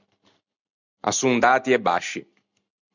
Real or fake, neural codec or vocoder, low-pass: real; none; 7.2 kHz